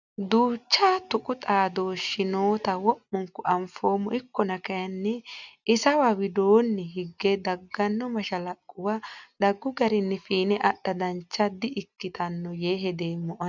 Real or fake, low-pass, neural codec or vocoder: real; 7.2 kHz; none